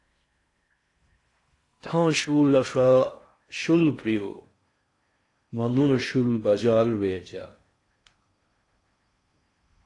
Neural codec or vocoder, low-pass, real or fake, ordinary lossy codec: codec, 16 kHz in and 24 kHz out, 0.6 kbps, FocalCodec, streaming, 4096 codes; 10.8 kHz; fake; AAC, 48 kbps